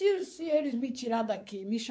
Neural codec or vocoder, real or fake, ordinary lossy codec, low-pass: codec, 16 kHz, 4 kbps, X-Codec, WavLM features, trained on Multilingual LibriSpeech; fake; none; none